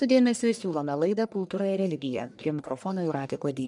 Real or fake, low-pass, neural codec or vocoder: fake; 10.8 kHz; codec, 44.1 kHz, 1.7 kbps, Pupu-Codec